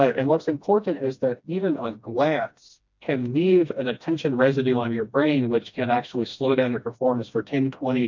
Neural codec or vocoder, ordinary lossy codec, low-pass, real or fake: codec, 16 kHz, 1 kbps, FreqCodec, smaller model; MP3, 64 kbps; 7.2 kHz; fake